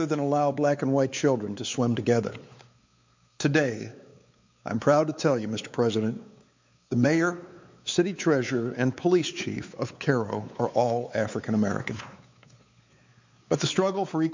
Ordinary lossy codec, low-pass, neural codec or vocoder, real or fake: MP3, 64 kbps; 7.2 kHz; codec, 16 kHz, 4 kbps, X-Codec, WavLM features, trained on Multilingual LibriSpeech; fake